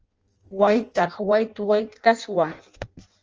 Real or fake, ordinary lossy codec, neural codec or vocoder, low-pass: fake; Opus, 24 kbps; codec, 16 kHz in and 24 kHz out, 0.6 kbps, FireRedTTS-2 codec; 7.2 kHz